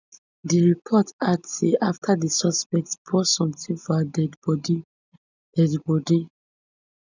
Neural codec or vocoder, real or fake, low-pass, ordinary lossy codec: none; real; 7.2 kHz; none